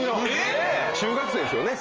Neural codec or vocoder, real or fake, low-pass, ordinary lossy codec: none; real; 7.2 kHz; Opus, 24 kbps